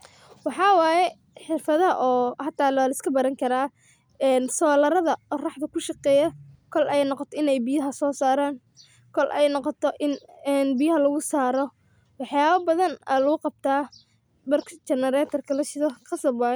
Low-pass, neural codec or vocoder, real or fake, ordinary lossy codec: none; none; real; none